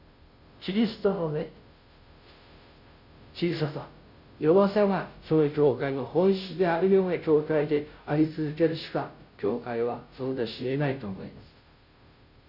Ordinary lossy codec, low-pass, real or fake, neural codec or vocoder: none; 5.4 kHz; fake; codec, 16 kHz, 0.5 kbps, FunCodec, trained on Chinese and English, 25 frames a second